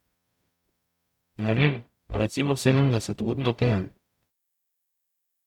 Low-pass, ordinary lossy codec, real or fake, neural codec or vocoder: 19.8 kHz; MP3, 96 kbps; fake; codec, 44.1 kHz, 0.9 kbps, DAC